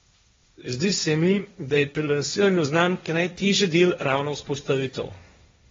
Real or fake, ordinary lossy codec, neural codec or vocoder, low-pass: fake; AAC, 24 kbps; codec, 16 kHz, 1.1 kbps, Voila-Tokenizer; 7.2 kHz